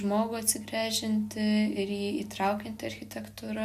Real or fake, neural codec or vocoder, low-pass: real; none; 14.4 kHz